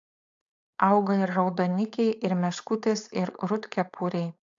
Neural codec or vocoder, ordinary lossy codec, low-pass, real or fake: codec, 16 kHz, 4.8 kbps, FACodec; AAC, 64 kbps; 7.2 kHz; fake